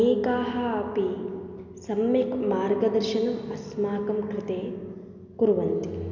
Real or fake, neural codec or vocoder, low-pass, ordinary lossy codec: real; none; 7.2 kHz; none